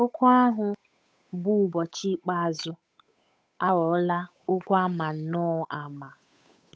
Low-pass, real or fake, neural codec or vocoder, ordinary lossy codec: none; fake; codec, 16 kHz, 8 kbps, FunCodec, trained on Chinese and English, 25 frames a second; none